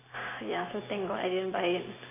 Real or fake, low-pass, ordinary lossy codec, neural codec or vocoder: real; 3.6 kHz; AAC, 16 kbps; none